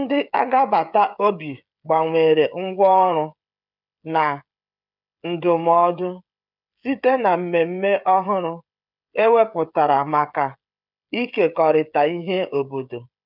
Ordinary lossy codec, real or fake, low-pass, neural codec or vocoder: none; fake; 5.4 kHz; codec, 16 kHz, 16 kbps, FreqCodec, smaller model